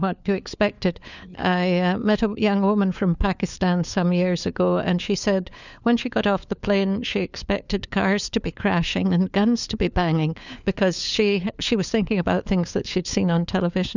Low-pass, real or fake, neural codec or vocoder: 7.2 kHz; fake; codec, 16 kHz, 4 kbps, FunCodec, trained on LibriTTS, 50 frames a second